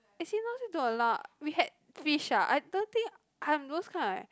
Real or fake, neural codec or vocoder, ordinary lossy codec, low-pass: real; none; none; none